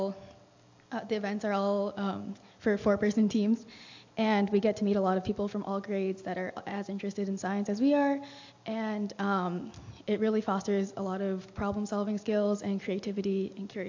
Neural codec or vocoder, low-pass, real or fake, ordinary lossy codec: none; 7.2 kHz; real; AAC, 48 kbps